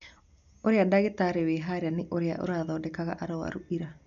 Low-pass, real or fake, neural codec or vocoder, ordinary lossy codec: 7.2 kHz; real; none; none